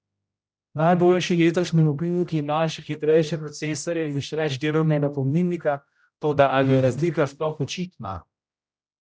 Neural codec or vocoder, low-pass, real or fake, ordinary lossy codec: codec, 16 kHz, 0.5 kbps, X-Codec, HuBERT features, trained on general audio; none; fake; none